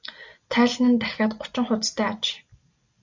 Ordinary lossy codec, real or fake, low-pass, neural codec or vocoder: AAC, 48 kbps; real; 7.2 kHz; none